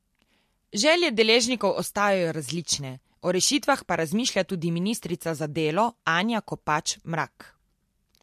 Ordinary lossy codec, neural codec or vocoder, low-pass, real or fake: MP3, 64 kbps; none; 14.4 kHz; real